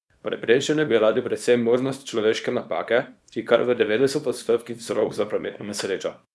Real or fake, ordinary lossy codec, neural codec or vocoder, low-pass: fake; none; codec, 24 kHz, 0.9 kbps, WavTokenizer, small release; none